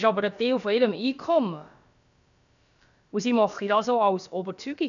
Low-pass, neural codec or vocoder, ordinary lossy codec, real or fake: 7.2 kHz; codec, 16 kHz, about 1 kbps, DyCAST, with the encoder's durations; none; fake